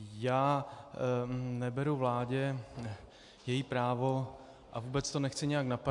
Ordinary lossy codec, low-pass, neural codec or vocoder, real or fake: AAC, 64 kbps; 10.8 kHz; none; real